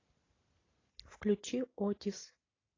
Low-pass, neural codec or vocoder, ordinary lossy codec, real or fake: 7.2 kHz; none; AAC, 32 kbps; real